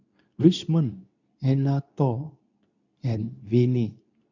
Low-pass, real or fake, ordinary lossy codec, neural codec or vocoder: 7.2 kHz; fake; none; codec, 24 kHz, 0.9 kbps, WavTokenizer, medium speech release version 2